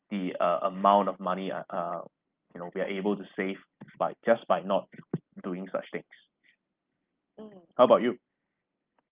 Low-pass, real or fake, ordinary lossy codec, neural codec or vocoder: 3.6 kHz; real; Opus, 32 kbps; none